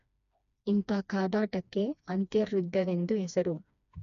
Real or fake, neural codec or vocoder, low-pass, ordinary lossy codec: fake; codec, 16 kHz, 2 kbps, FreqCodec, smaller model; 7.2 kHz; none